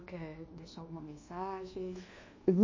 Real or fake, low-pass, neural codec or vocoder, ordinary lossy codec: fake; 7.2 kHz; codec, 24 kHz, 1.2 kbps, DualCodec; MP3, 32 kbps